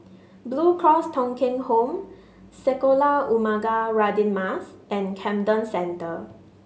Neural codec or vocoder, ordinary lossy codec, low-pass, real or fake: none; none; none; real